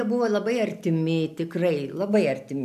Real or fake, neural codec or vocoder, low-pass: real; none; 14.4 kHz